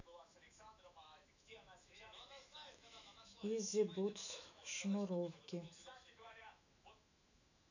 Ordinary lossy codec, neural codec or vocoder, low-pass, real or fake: none; autoencoder, 48 kHz, 128 numbers a frame, DAC-VAE, trained on Japanese speech; 7.2 kHz; fake